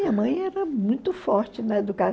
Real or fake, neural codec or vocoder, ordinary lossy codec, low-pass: real; none; none; none